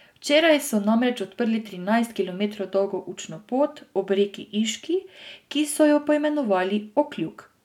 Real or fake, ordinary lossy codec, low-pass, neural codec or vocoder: real; none; 19.8 kHz; none